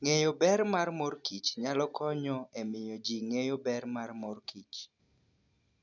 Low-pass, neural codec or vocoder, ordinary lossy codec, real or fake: 7.2 kHz; none; none; real